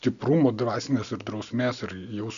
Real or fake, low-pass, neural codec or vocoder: real; 7.2 kHz; none